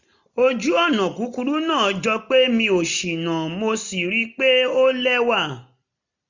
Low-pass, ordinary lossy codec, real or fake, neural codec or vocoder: 7.2 kHz; none; real; none